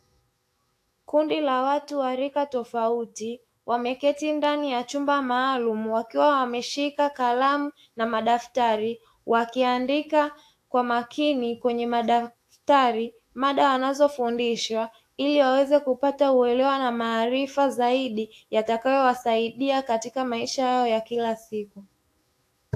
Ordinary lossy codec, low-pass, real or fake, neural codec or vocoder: AAC, 64 kbps; 14.4 kHz; fake; autoencoder, 48 kHz, 128 numbers a frame, DAC-VAE, trained on Japanese speech